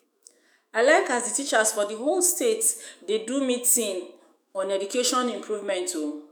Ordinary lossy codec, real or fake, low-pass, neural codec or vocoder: none; fake; none; autoencoder, 48 kHz, 128 numbers a frame, DAC-VAE, trained on Japanese speech